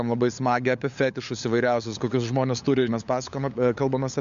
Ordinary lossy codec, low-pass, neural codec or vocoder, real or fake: MP3, 64 kbps; 7.2 kHz; codec, 16 kHz, 8 kbps, FunCodec, trained on LibriTTS, 25 frames a second; fake